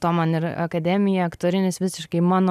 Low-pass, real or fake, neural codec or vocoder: 14.4 kHz; real; none